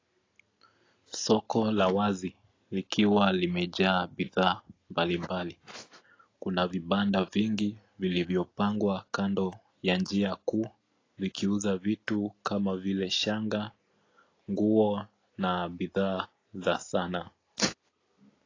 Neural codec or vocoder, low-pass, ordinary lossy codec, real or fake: none; 7.2 kHz; AAC, 32 kbps; real